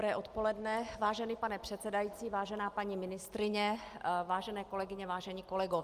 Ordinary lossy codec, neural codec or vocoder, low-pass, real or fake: Opus, 32 kbps; none; 14.4 kHz; real